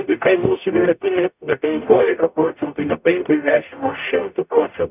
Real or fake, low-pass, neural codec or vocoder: fake; 3.6 kHz; codec, 44.1 kHz, 0.9 kbps, DAC